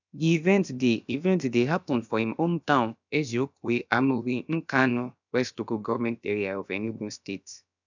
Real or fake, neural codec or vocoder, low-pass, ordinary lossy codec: fake; codec, 16 kHz, about 1 kbps, DyCAST, with the encoder's durations; 7.2 kHz; none